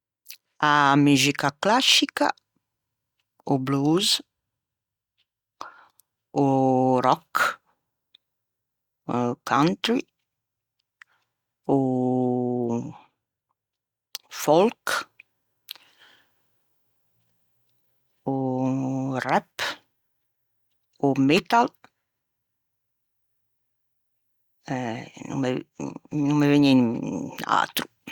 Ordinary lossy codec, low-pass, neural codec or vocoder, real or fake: Opus, 64 kbps; 19.8 kHz; none; real